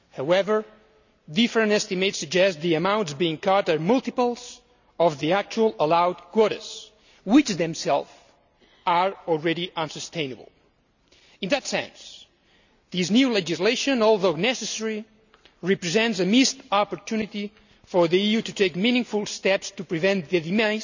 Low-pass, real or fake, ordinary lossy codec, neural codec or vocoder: 7.2 kHz; real; none; none